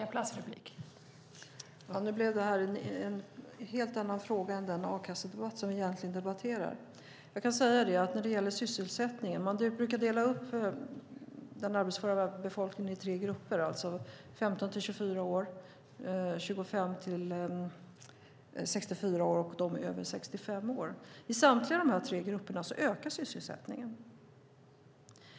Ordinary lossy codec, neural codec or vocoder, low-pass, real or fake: none; none; none; real